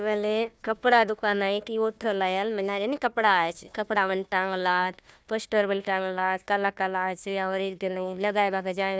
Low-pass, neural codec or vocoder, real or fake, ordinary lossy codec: none; codec, 16 kHz, 1 kbps, FunCodec, trained on Chinese and English, 50 frames a second; fake; none